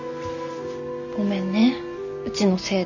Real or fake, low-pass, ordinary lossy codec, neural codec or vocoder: real; 7.2 kHz; none; none